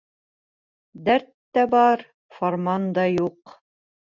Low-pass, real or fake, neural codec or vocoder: 7.2 kHz; real; none